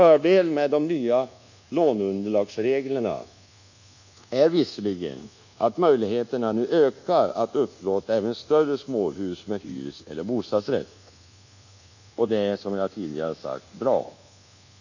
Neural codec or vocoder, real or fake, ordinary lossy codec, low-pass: codec, 24 kHz, 1.2 kbps, DualCodec; fake; none; 7.2 kHz